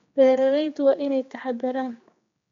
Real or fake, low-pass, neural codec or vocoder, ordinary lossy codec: fake; 7.2 kHz; codec, 16 kHz, 2 kbps, X-Codec, HuBERT features, trained on general audio; MP3, 48 kbps